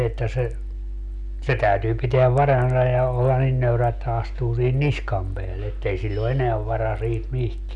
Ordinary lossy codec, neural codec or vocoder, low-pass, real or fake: none; none; 10.8 kHz; real